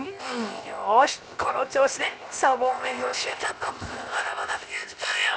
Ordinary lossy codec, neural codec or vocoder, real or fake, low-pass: none; codec, 16 kHz, 0.7 kbps, FocalCodec; fake; none